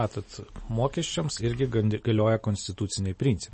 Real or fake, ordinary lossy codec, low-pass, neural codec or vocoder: real; MP3, 32 kbps; 10.8 kHz; none